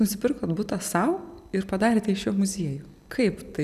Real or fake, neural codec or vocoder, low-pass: real; none; 14.4 kHz